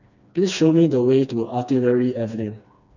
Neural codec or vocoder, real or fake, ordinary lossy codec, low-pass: codec, 16 kHz, 2 kbps, FreqCodec, smaller model; fake; none; 7.2 kHz